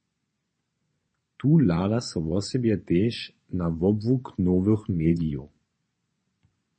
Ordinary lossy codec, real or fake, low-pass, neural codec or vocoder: MP3, 32 kbps; fake; 9.9 kHz; vocoder, 24 kHz, 100 mel bands, Vocos